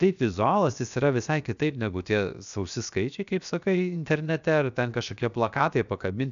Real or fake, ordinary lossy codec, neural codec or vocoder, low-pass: fake; MP3, 96 kbps; codec, 16 kHz, 0.7 kbps, FocalCodec; 7.2 kHz